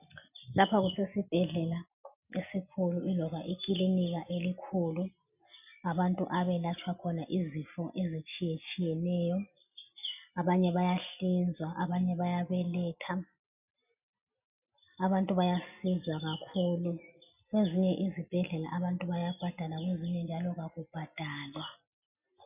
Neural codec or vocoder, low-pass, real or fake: none; 3.6 kHz; real